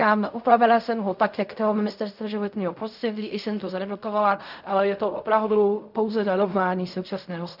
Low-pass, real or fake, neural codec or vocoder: 5.4 kHz; fake; codec, 16 kHz in and 24 kHz out, 0.4 kbps, LongCat-Audio-Codec, fine tuned four codebook decoder